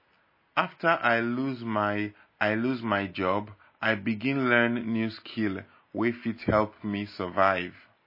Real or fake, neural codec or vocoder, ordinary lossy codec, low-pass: real; none; MP3, 24 kbps; 5.4 kHz